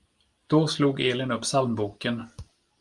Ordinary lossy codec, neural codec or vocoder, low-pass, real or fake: Opus, 32 kbps; none; 10.8 kHz; real